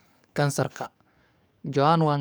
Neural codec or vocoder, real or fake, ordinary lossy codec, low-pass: codec, 44.1 kHz, 7.8 kbps, DAC; fake; none; none